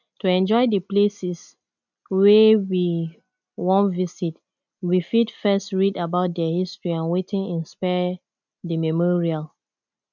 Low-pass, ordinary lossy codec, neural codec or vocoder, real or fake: 7.2 kHz; none; none; real